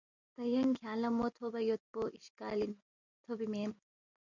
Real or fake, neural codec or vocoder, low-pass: real; none; 7.2 kHz